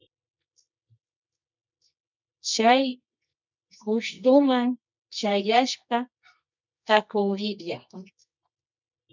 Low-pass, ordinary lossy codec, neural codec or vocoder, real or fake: 7.2 kHz; MP3, 64 kbps; codec, 24 kHz, 0.9 kbps, WavTokenizer, medium music audio release; fake